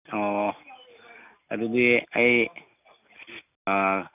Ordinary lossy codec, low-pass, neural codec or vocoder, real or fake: none; 3.6 kHz; none; real